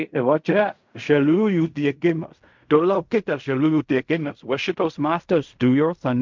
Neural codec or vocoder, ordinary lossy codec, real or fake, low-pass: codec, 16 kHz in and 24 kHz out, 0.4 kbps, LongCat-Audio-Codec, fine tuned four codebook decoder; MP3, 64 kbps; fake; 7.2 kHz